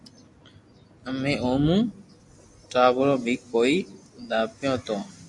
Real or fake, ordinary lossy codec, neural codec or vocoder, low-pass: real; AAC, 64 kbps; none; 10.8 kHz